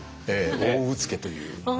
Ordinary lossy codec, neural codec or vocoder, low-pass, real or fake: none; none; none; real